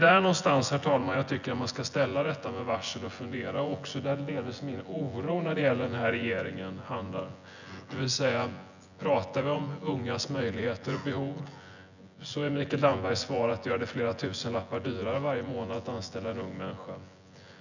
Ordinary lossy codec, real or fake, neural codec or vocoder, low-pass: none; fake; vocoder, 24 kHz, 100 mel bands, Vocos; 7.2 kHz